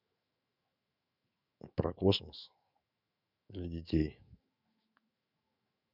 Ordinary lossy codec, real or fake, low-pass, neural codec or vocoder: none; fake; 5.4 kHz; autoencoder, 48 kHz, 128 numbers a frame, DAC-VAE, trained on Japanese speech